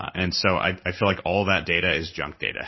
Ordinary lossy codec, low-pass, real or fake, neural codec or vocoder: MP3, 24 kbps; 7.2 kHz; fake; codec, 16 kHz in and 24 kHz out, 1 kbps, XY-Tokenizer